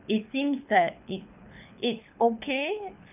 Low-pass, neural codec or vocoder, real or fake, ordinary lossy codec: 3.6 kHz; codec, 24 kHz, 6 kbps, HILCodec; fake; none